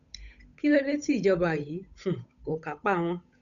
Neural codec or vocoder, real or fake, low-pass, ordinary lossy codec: codec, 16 kHz, 8 kbps, FunCodec, trained on Chinese and English, 25 frames a second; fake; 7.2 kHz; none